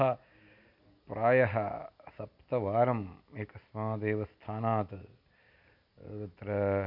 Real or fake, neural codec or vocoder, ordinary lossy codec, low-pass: real; none; none; 5.4 kHz